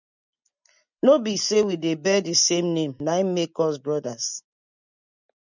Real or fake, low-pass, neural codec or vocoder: real; 7.2 kHz; none